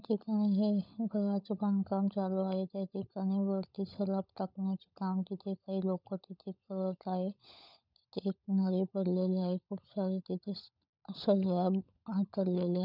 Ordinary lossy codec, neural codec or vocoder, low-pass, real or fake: none; codec, 16 kHz, 16 kbps, FunCodec, trained on LibriTTS, 50 frames a second; 5.4 kHz; fake